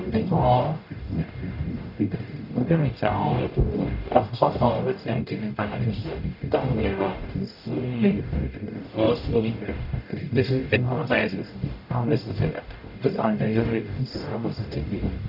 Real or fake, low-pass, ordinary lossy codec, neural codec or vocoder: fake; 5.4 kHz; none; codec, 44.1 kHz, 0.9 kbps, DAC